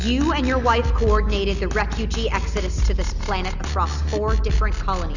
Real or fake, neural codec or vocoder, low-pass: real; none; 7.2 kHz